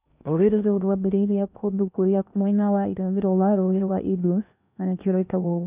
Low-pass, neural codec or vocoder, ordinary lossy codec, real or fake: 3.6 kHz; codec, 16 kHz in and 24 kHz out, 0.8 kbps, FocalCodec, streaming, 65536 codes; none; fake